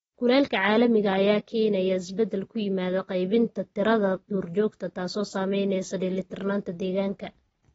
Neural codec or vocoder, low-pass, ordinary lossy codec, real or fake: none; 19.8 kHz; AAC, 24 kbps; real